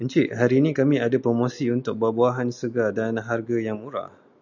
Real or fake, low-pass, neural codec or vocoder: real; 7.2 kHz; none